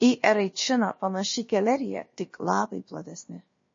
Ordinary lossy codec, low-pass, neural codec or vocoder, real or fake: MP3, 32 kbps; 7.2 kHz; codec, 16 kHz, about 1 kbps, DyCAST, with the encoder's durations; fake